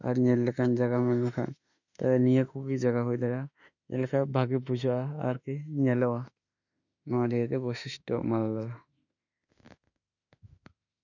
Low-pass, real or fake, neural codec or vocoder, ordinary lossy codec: 7.2 kHz; fake; autoencoder, 48 kHz, 32 numbers a frame, DAC-VAE, trained on Japanese speech; none